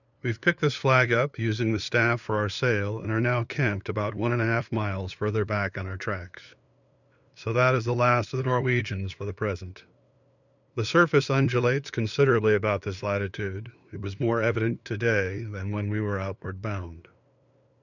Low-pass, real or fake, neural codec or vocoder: 7.2 kHz; fake; codec, 16 kHz, 2 kbps, FunCodec, trained on LibriTTS, 25 frames a second